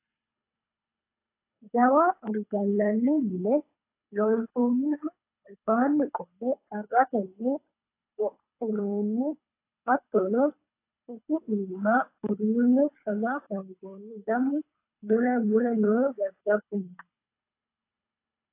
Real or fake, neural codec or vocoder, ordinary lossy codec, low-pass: fake; codec, 24 kHz, 3 kbps, HILCodec; AAC, 24 kbps; 3.6 kHz